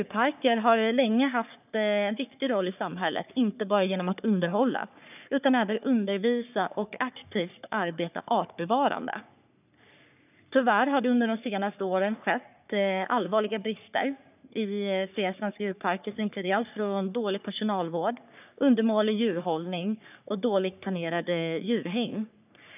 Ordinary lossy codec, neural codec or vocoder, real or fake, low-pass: none; codec, 44.1 kHz, 3.4 kbps, Pupu-Codec; fake; 3.6 kHz